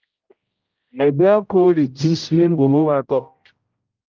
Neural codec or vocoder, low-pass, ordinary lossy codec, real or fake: codec, 16 kHz, 0.5 kbps, X-Codec, HuBERT features, trained on general audio; 7.2 kHz; Opus, 24 kbps; fake